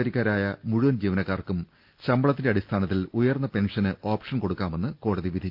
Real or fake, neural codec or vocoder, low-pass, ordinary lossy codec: real; none; 5.4 kHz; Opus, 24 kbps